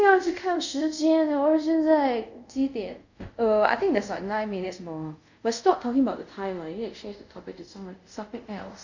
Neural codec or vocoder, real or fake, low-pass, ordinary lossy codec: codec, 24 kHz, 0.5 kbps, DualCodec; fake; 7.2 kHz; none